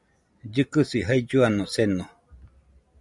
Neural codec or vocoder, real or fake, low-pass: none; real; 10.8 kHz